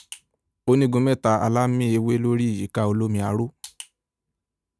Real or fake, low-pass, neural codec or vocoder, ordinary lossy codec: real; none; none; none